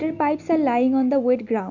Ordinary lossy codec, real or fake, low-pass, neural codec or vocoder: none; real; 7.2 kHz; none